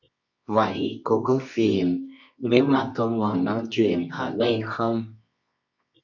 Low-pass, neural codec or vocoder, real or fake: 7.2 kHz; codec, 24 kHz, 0.9 kbps, WavTokenizer, medium music audio release; fake